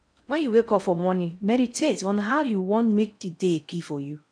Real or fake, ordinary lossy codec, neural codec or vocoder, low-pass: fake; MP3, 96 kbps; codec, 16 kHz in and 24 kHz out, 0.6 kbps, FocalCodec, streaming, 2048 codes; 9.9 kHz